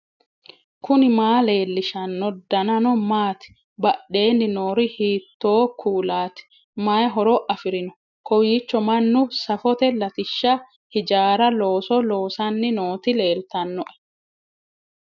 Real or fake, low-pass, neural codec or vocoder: real; 7.2 kHz; none